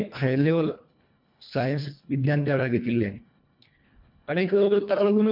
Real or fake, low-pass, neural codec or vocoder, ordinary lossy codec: fake; 5.4 kHz; codec, 24 kHz, 1.5 kbps, HILCodec; MP3, 48 kbps